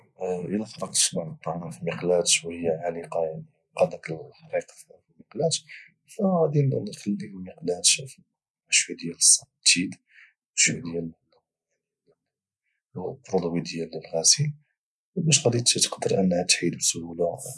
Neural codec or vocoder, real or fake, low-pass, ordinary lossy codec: none; real; none; none